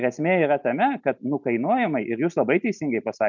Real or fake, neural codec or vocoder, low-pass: real; none; 7.2 kHz